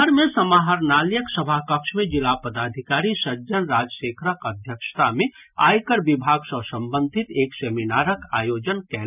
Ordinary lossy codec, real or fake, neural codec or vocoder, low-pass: none; real; none; 3.6 kHz